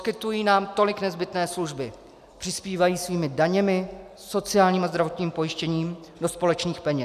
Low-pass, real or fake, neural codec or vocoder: 14.4 kHz; real; none